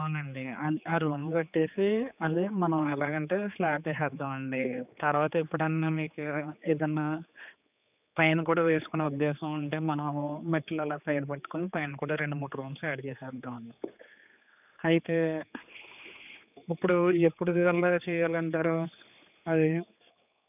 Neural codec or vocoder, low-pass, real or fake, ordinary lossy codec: codec, 16 kHz, 4 kbps, X-Codec, HuBERT features, trained on general audio; 3.6 kHz; fake; none